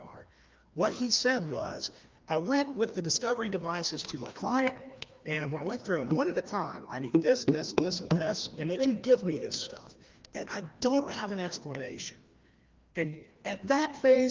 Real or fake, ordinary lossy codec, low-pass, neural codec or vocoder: fake; Opus, 32 kbps; 7.2 kHz; codec, 16 kHz, 1 kbps, FreqCodec, larger model